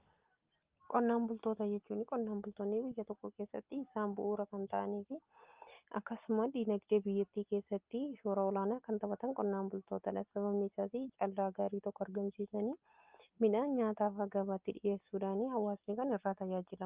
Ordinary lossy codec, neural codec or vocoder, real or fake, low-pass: Opus, 24 kbps; none; real; 3.6 kHz